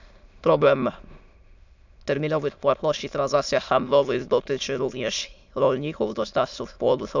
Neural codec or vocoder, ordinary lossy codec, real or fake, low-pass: autoencoder, 22.05 kHz, a latent of 192 numbers a frame, VITS, trained on many speakers; none; fake; 7.2 kHz